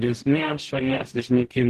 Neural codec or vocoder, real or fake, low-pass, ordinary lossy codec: codec, 44.1 kHz, 0.9 kbps, DAC; fake; 14.4 kHz; Opus, 16 kbps